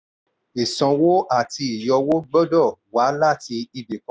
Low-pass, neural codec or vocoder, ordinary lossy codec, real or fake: none; none; none; real